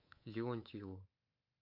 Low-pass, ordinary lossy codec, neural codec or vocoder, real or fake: 5.4 kHz; MP3, 32 kbps; codec, 24 kHz, 3.1 kbps, DualCodec; fake